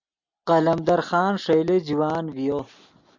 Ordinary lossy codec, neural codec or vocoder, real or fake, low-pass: MP3, 64 kbps; none; real; 7.2 kHz